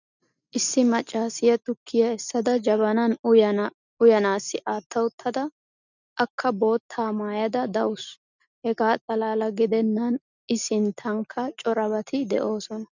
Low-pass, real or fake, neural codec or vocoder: 7.2 kHz; real; none